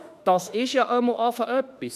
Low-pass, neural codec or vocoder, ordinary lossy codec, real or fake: 14.4 kHz; autoencoder, 48 kHz, 32 numbers a frame, DAC-VAE, trained on Japanese speech; none; fake